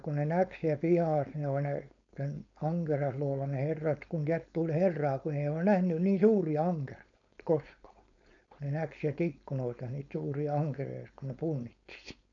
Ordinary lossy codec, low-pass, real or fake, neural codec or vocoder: none; 7.2 kHz; fake; codec, 16 kHz, 4.8 kbps, FACodec